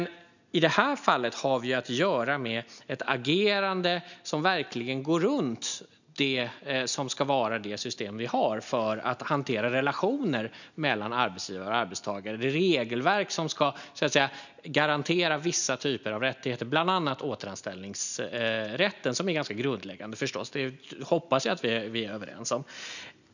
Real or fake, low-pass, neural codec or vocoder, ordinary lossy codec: real; 7.2 kHz; none; none